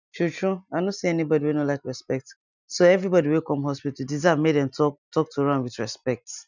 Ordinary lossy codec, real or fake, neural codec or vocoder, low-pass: none; real; none; 7.2 kHz